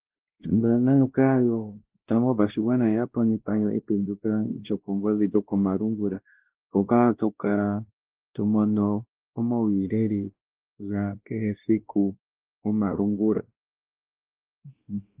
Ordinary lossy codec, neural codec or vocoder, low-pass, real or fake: Opus, 16 kbps; codec, 16 kHz, 1 kbps, X-Codec, WavLM features, trained on Multilingual LibriSpeech; 3.6 kHz; fake